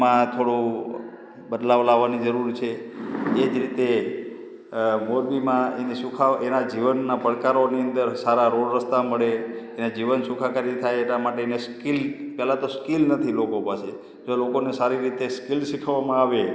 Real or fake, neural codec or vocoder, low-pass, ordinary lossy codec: real; none; none; none